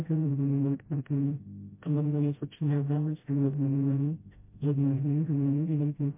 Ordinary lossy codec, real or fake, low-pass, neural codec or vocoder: MP3, 24 kbps; fake; 3.6 kHz; codec, 16 kHz, 0.5 kbps, FreqCodec, smaller model